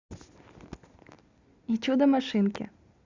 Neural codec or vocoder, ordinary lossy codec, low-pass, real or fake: none; Opus, 64 kbps; 7.2 kHz; real